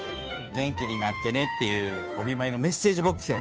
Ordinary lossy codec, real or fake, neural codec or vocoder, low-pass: none; fake; codec, 16 kHz, 2 kbps, FunCodec, trained on Chinese and English, 25 frames a second; none